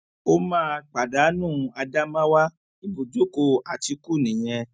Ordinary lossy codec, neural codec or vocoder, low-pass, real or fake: none; none; none; real